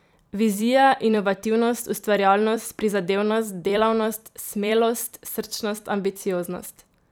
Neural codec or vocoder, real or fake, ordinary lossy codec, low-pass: vocoder, 44.1 kHz, 128 mel bands every 256 samples, BigVGAN v2; fake; none; none